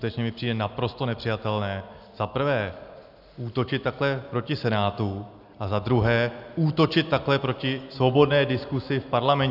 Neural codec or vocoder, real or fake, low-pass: none; real; 5.4 kHz